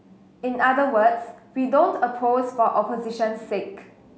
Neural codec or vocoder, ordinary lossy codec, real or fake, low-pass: none; none; real; none